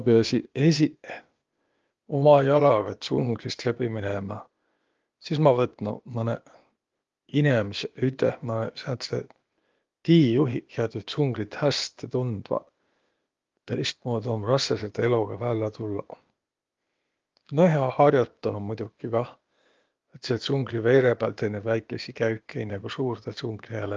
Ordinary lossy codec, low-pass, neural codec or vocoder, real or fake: Opus, 32 kbps; 7.2 kHz; codec, 16 kHz, 0.8 kbps, ZipCodec; fake